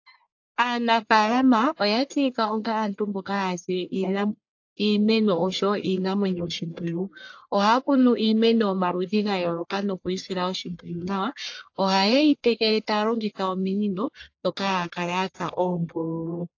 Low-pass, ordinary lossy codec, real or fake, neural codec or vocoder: 7.2 kHz; AAC, 48 kbps; fake; codec, 44.1 kHz, 1.7 kbps, Pupu-Codec